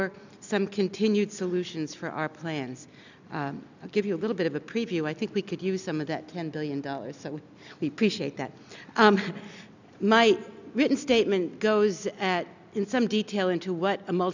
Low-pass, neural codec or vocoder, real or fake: 7.2 kHz; none; real